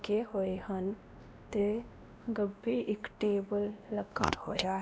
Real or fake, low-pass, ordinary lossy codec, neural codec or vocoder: fake; none; none; codec, 16 kHz, 1 kbps, X-Codec, WavLM features, trained on Multilingual LibriSpeech